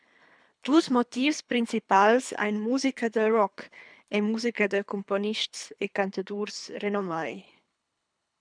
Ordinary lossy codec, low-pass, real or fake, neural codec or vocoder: MP3, 96 kbps; 9.9 kHz; fake; codec, 24 kHz, 3 kbps, HILCodec